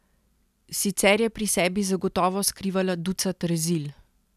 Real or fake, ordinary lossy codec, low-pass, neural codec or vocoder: real; none; 14.4 kHz; none